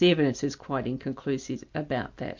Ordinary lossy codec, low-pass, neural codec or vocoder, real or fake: MP3, 64 kbps; 7.2 kHz; none; real